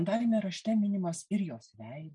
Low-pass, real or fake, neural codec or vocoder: 10.8 kHz; real; none